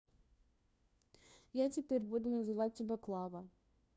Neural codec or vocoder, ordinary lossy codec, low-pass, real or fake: codec, 16 kHz, 1 kbps, FunCodec, trained on LibriTTS, 50 frames a second; none; none; fake